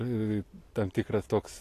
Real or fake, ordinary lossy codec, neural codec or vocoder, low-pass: real; AAC, 48 kbps; none; 14.4 kHz